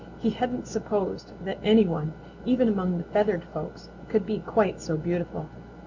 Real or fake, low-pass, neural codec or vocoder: real; 7.2 kHz; none